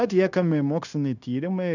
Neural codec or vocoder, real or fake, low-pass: codec, 16 kHz, 0.9 kbps, LongCat-Audio-Codec; fake; 7.2 kHz